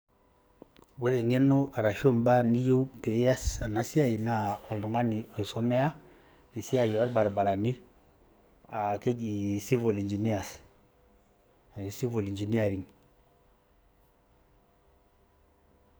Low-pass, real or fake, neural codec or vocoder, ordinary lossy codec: none; fake; codec, 44.1 kHz, 2.6 kbps, SNAC; none